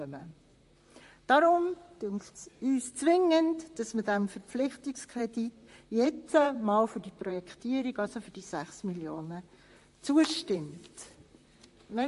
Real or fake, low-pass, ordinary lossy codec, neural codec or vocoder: fake; 14.4 kHz; MP3, 48 kbps; vocoder, 44.1 kHz, 128 mel bands, Pupu-Vocoder